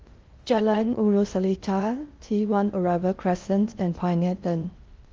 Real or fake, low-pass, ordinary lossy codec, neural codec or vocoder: fake; 7.2 kHz; Opus, 24 kbps; codec, 16 kHz in and 24 kHz out, 0.6 kbps, FocalCodec, streaming, 2048 codes